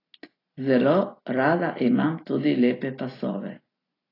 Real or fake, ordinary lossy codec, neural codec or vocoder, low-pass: real; AAC, 24 kbps; none; 5.4 kHz